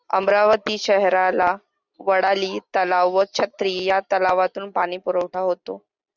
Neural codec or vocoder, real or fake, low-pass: none; real; 7.2 kHz